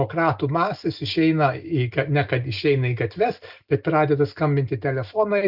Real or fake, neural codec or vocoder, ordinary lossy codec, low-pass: real; none; Opus, 64 kbps; 5.4 kHz